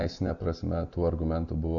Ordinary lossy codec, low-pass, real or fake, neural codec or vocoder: MP3, 96 kbps; 7.2 kHz; real; none